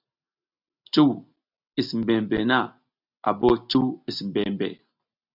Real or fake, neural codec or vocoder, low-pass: real; none; 5.4 kHz